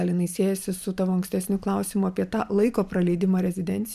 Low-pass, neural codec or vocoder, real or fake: 14.4 kHz; vocoder, 44.1 kHz, 128 mel bands every 512 samples, BigVGAN v2; fake